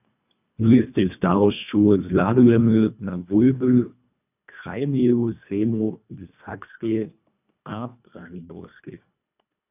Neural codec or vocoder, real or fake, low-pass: codec, 24 kHz, 1.5 kbps, HILCodec; fake; 3.6 kHz